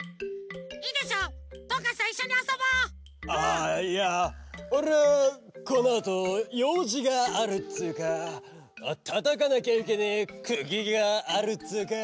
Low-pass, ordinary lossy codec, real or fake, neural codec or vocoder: none; none; real; none